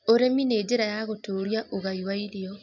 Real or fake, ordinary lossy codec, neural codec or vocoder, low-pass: real; none; none; 7.2 kHz